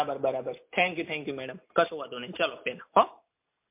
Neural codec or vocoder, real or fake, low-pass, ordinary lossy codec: none; real; 3.6 kHz; MP3, 32 kbps